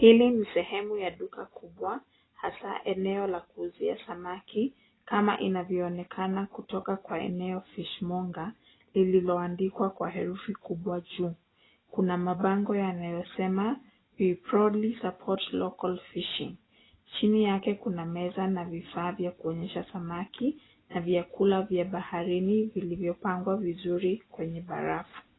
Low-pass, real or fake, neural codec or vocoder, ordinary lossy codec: 7.2 kHz; real; none; AAC, 16 kbps